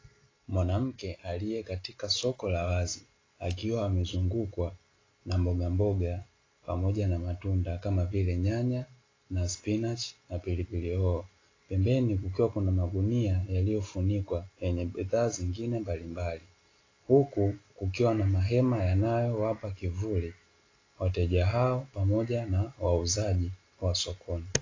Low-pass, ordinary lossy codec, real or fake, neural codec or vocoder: 7.2 kHz; AAC, 32 kbps; real; none